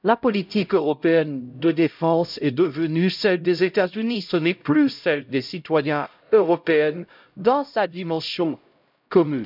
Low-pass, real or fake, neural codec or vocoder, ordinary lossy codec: 5.4 kHz; fake; codec, 16 kHz, 0.5 kbps, X-Codec, HuBERT features, trained on LibriSpeech; none